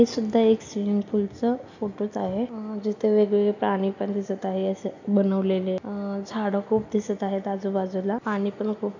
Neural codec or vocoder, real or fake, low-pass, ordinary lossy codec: none; real; 7.2 kHz; none